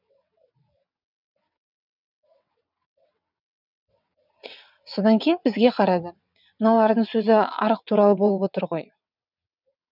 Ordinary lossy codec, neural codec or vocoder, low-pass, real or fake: none; vocoder, 22.05 kHz, 80 mel bands, WaveNeXt; 5.4 kHz; fake